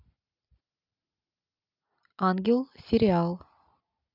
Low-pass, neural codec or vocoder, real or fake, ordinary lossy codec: 5.4 kHz; none; real; none